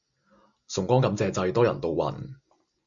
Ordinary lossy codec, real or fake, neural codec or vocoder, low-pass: MP3, 64 kbps; real; none; 7.2 kHz